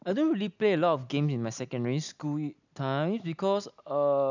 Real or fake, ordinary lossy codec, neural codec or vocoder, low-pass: real; none; none; 7.2 kHz